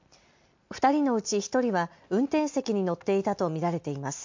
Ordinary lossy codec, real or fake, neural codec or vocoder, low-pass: MP3, 48 kbps; real; none; 7.2 kHz